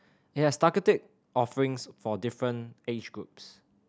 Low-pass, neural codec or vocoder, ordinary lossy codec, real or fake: none; none; none; real